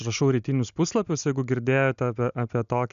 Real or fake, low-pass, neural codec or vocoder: real; 7.2 kHz; none